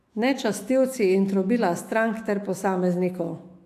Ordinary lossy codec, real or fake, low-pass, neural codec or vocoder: AAC, 64 kbps; fake; 14.4 kHz; autoencoder, 48 kHz, 128 numbers a frame, DAC-VAE, trained on Japanese speech